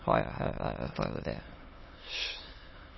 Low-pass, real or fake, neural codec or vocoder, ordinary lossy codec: 7.2 kHz; fake; autoencoder, 22.05 kHz, a latent of 192 numbers a frame, VITS, trained on many speakers; MP3, 24 kbps